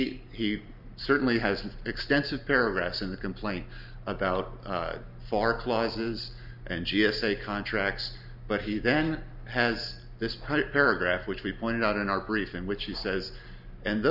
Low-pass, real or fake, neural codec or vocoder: 5.4 kHz; real; none